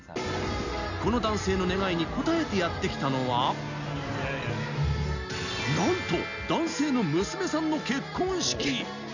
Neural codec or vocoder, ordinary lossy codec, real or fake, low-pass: none; none; real; 7.2 kHz